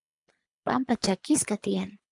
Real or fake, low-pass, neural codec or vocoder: fake; 10.8 kHz; codec, 24 kHz, 3 kbps, HILCodec